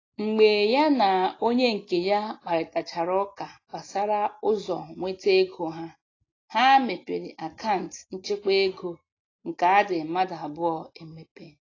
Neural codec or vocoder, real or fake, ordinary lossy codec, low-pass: none; real; AAC, 32 kbps; 7.2 kHz